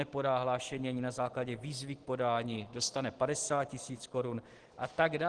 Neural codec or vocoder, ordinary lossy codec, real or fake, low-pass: none; Opus, 16 kbps; real; 9.9 kHz